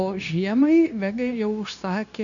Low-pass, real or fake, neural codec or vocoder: 7.2 kHz; fake; codec, 16 kHz, 0.9 kbps, LongCat-Audio-Codec